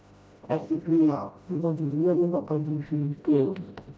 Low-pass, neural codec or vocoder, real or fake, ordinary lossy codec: none; codec, 16 kHz, 0.5 kbps, FreqCodec, smaller model; fake; none